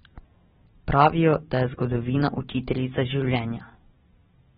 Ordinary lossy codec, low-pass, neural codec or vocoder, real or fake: AAC, 16 kbps; 10.8 kHz; none; real